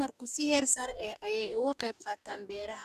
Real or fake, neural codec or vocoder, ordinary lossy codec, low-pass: fake; codec, 44.1 kHz, 2.6 kbps, DAC; none; 14.4 kHz